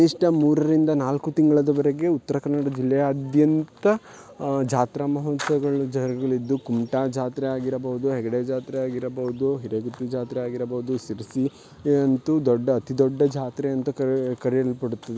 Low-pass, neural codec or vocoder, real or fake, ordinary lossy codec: none; none; real; none